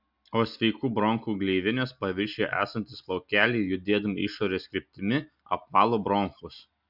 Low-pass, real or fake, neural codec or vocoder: 5.4 kHz; real; none